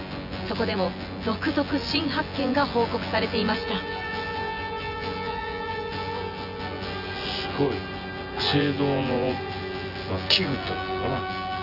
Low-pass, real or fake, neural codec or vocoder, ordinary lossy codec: 5.4 kHz; fake; vocoder, 24 kHz, 100 mel bands, Vocos; none